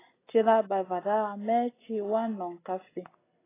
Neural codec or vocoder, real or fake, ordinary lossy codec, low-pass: none; real; AAC, 16 kbps; 3.6 kHz